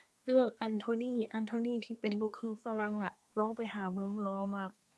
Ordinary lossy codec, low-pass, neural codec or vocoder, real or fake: none; none; codec, 24 kHz, 1 kbps, SNAC; fake